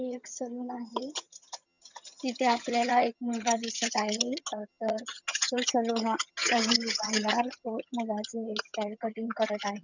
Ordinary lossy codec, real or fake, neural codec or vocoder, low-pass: none; fake; vocoder, 22.05 kHz, 80 mel bands, HiFi-GAN; 7.2 kHz